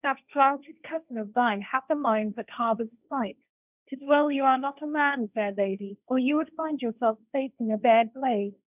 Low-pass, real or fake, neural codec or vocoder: 3.6 kHz; fake; codec, 16 kHz, 1.1 kbps, Voila-Tokenizer